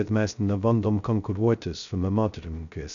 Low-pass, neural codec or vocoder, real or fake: 7.2 kHz; codec, 16 kHz, 0.2 kbps, FocalCodec; fake